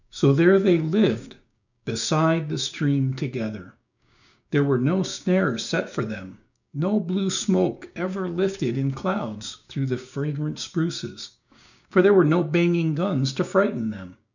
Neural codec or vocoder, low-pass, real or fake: codec, 16 kHz, 6 kbps, DAC; 7.2 kHz; fake